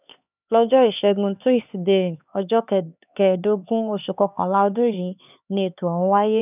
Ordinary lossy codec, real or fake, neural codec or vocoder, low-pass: none; fake; codec, 16 kHz, 4 kbps, X-Codec, HuBERT features, trained on LibriSpeech; 3.6 kHz